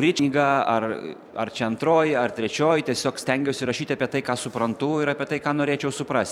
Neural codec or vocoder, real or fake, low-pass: vocoder, 44.1 kHz, 128 mel bands every 512 samples, BigVGAN v2; fake; 19.8 kHz